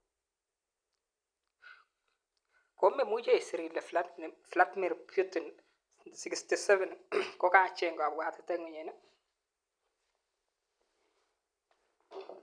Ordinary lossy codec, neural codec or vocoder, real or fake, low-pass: none; none; real; 9.9 kHz